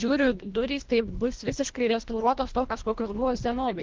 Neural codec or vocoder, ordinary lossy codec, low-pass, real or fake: codec, 24 kHz, 1.5 kbps, HILCodec; Opus, 16 kbps; 7.2 kHz; fake